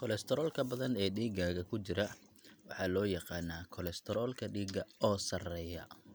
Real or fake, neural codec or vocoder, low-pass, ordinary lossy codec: real; none; none; none